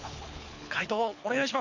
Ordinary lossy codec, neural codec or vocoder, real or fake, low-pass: none; codec, 24 kHz, 6 kbps, HILCodec; fake; 7.2 kHz